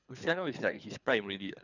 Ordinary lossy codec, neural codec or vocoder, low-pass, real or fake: none; codec, 24 kHz, 3 kbps, HILCodec; 7.2 kHz; fake